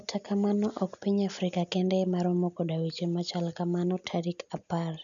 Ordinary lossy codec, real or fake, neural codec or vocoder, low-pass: none; real; none; 7.2 kHz